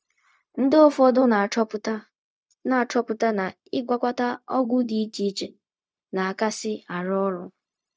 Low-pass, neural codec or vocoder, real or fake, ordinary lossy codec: none; codec, 16 kHz, 0.4 kbps, LongCat-Audio-Codec; fake; none